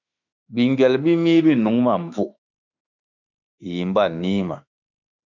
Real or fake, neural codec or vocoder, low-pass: fake; autoencoder, 48 kHz, 32 numbers a frame, DAC-VAE, trained on Japanese speech; 7.2 kHz